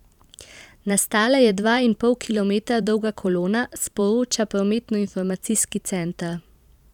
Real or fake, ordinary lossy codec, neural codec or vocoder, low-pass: fake; none; vocoder, 44.1 kHz, 128 mel bands every 512 samples, BigVGAN v2; 19.8 kHz